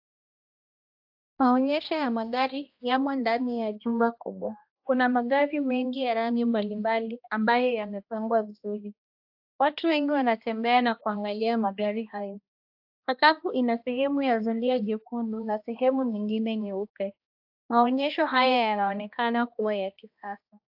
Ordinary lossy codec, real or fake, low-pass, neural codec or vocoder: AAC, 48 kbps; fake; 5.4 kHz; codec, 16 kHz, 1 kbps, X-Codec, HuBERT features, trained on balanced general audio